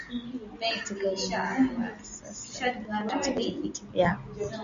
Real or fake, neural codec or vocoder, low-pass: real; none; 7.2 kHz